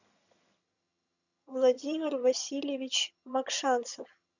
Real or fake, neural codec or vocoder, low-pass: fake; vocoder, 22.05 kHz, 80 mel bands, HiFi-GAN; 7.2 kHz